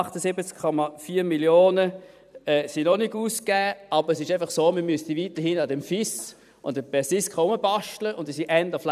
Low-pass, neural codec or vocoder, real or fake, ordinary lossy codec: 14.4 kHz; vocoder, 44.1 kHz, 128 mel bands every 512 samples, BigVGAN v2; fake; none